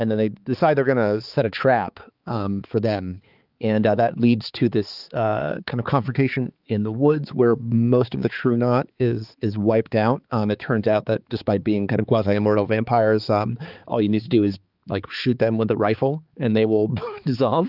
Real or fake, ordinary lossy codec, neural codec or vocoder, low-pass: fake; Opus, 24 kbps; codec, 16 kHz, 4 kbps, X-Codec, HuBERT features, trained on balanced general audio; 5.4 kHz